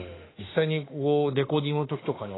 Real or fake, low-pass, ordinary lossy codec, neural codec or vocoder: fake; 7.2 kHz; AAC, 16 kbps; autoencoder, 48 kHz, 32 numbers a frame, DAC-VAE, trained on Japanese speech